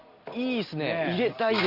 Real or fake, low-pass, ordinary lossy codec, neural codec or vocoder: real; 5.4 kHz; Opus, 64 kbps; none